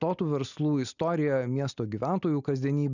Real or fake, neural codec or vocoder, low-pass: real; none; 7.2 kHz